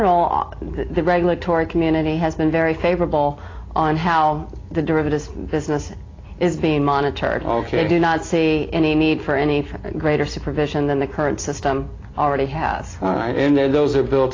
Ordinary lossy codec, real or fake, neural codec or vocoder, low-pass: AAC, 32 kbps; real; none; 7.2 kHz